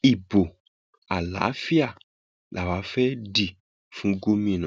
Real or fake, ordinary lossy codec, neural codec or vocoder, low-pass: real; none; none; none